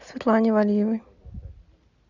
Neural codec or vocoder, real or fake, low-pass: none; real; 7.2 kHz